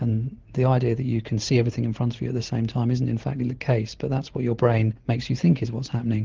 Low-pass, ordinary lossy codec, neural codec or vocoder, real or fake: 7.2 kHz; Opus, 16 kbps; none; real